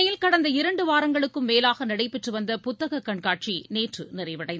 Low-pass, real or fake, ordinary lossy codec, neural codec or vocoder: none; real; none; none